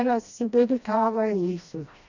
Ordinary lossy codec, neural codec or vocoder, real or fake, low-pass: none; codec, 16 kHz, 1 kbps, FreqCodec, smaller model; fake; 7.2 kHz